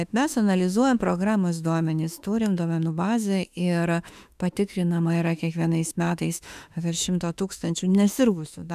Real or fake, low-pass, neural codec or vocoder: fake; 14.4 kHz; autoencoder, 48 kHz, 32 numbers a frame, DAC-VAE, trained on Japanese speech